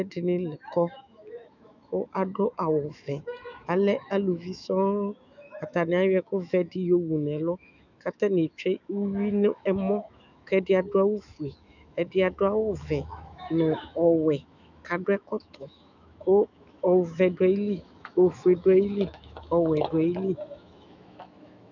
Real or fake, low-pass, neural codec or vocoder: fake; 7.2 kHz; autoencoder, 48 kHz, 128 numbers a frame, DAC-VAE, trained on Japanese speech